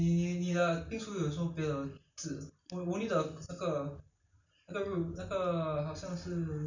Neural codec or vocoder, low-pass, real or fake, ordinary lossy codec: none; 7.2 kHz; real; none